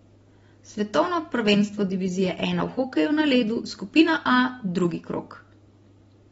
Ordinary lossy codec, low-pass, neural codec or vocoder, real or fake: AAC, 24 kbps; 19.8 kHz; none; real